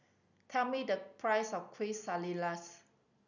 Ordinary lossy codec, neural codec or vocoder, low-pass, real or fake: none; none; 7.2 kHz; real